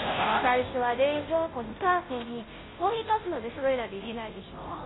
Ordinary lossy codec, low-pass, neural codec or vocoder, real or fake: AAC, 16 kbps; 7.2 kHz; codec, 16 kHz, 0.5 kbps, FunCodec, trained on Chinese and English, 25 frames a second; fake